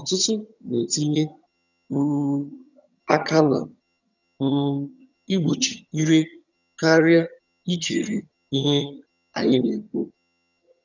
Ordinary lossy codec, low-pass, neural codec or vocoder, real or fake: none; 7.2 kHz; vocoder, 22.05 kHz, 80 mel bands, HiFi-GAN; fake